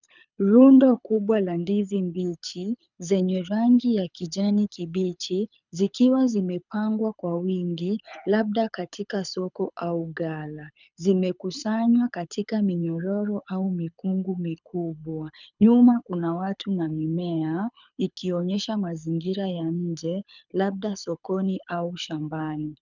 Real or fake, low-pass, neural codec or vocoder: fake; 7.2 kHz; codec, 24 kHz, 6 kbps, HILCodec